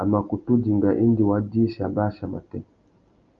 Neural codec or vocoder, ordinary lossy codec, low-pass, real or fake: none; Opus, 32 kbps; 7.2 kHz; real